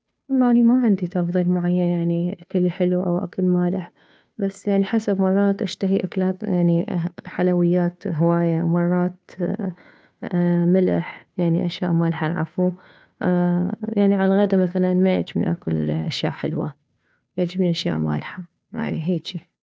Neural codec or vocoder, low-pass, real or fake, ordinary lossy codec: codec, 16 kHz, 2 kbps, FunCodec, trained on Chinese and English, 25 frames a second; none; fake; none